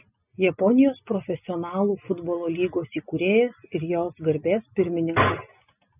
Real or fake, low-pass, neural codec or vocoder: real; 3.6 kHz; none